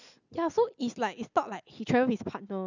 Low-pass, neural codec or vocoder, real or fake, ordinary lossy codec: 7.2 kHz; none; real; none